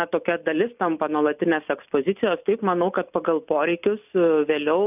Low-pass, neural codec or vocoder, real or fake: 3.6 kHz; none; real